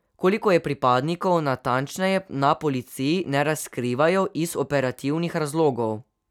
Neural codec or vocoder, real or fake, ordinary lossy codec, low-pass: none; real; none; 19.8 kHz